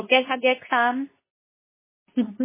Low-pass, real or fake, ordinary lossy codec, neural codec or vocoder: 3.6 kHz; fake; MP3, 16 kbps; codec, 24 kHz, 0.9 kbps, WavTokenizer, medium speech release version 2